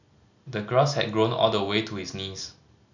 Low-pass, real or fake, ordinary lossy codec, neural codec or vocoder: 7.2 kHz; real; none; none